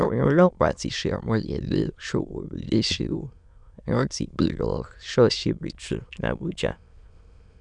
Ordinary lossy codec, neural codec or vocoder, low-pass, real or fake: none; autoencoder, 22.05 kHz, a latent of 192 numbers a frame, VITS, trained on many speakers; 9.9 kHz; fake